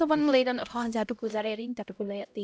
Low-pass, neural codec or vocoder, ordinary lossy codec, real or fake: none; codec, 16 kHz, 0.5 kbps, X-Codec, HuBERT features, trained on LibriSpeech; none; fake